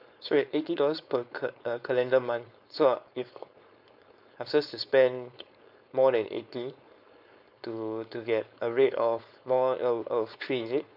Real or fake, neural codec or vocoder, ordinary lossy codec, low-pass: fake; codec, 16 kHz, 4.8 kbps, FACodec; none; 5.4 kHz